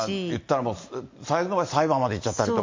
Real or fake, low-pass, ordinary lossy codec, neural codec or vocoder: real; 7.2 kHz; MP3, 48 kbps; none